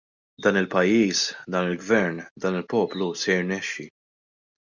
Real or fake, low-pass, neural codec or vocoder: real; 7.2 kHz; none